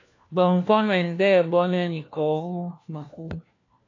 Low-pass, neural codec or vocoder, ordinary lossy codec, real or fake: 7.2 kHz; codec, 16 kHz, 1 kbps, FunCodec, trained on LibriTTS, 50 frames a second; AAC, 48 kbps; fake